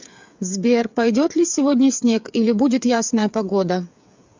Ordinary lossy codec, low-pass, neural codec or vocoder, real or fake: MP3, 64 kbps; 7.2 kHz; codec, 16 kHz, 8 kbps, FreqCodec, smaller model; fake